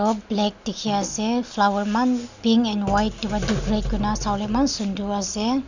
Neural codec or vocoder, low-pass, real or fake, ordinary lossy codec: none; 7.2 kHz; real; none